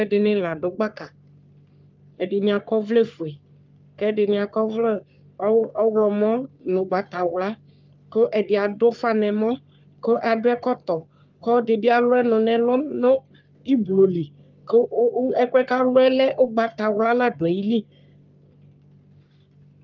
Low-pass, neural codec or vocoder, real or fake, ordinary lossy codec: 7.2 kHz; codec, 44.1 kHz, 3.4 kbps, Pupu-Codec; fake; Opus, 24 kbps